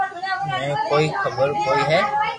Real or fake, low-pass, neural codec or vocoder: real; 10.8 kHz; none